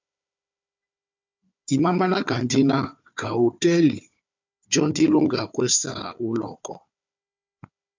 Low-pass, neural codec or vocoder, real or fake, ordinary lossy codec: 7.2 kHz; codec, 16 kHz, 4 kbps, FunCodec, trained on Chinese and English, 50 frames a second; fake; MP3, 64 kbps